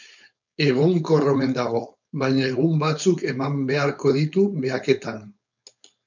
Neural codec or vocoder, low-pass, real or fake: codec, 16 kHz, 4.8 kbps, FACodec; 7.2 kHz; fake